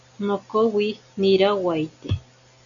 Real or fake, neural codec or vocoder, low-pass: real; none; 7.2 kHz